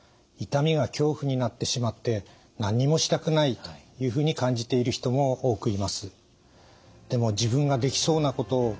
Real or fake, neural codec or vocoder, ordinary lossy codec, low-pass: real; none; none; none